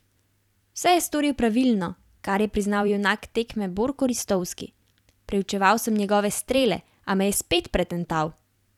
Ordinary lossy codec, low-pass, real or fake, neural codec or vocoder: none; 19.8 kHz; fake; vocoder, 44.1 kHz, 128 mel bands every 256 samples, BigVGAN v2